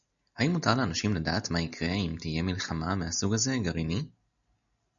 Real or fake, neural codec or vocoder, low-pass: real; none; 7.2 kHz